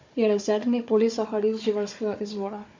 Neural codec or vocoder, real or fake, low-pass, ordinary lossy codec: codec, 16 kHz, 4 kbps, FunCodec, trained on Chinese and English, 50 frames a second; fake; 7.2 kHz; MP3, 48 kbps